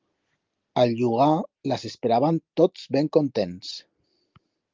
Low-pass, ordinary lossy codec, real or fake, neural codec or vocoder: 7.2 kHz; Opus, 32 kbps; real; none